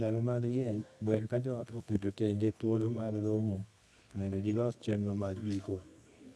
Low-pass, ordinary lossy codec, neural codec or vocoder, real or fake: none; none; codec, 24 kHz, 0.9 kbps, WavTokenizer, medium music audio release; fake